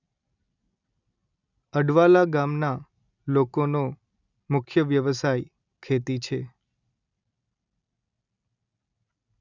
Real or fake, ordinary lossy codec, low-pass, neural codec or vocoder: real; none; 7.2 kHz; none